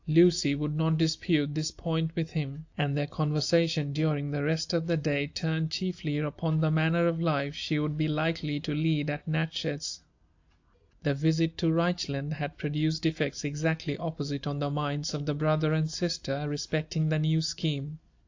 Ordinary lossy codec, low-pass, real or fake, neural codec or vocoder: AAC, 48 kbps; 7.2 kHz; real; none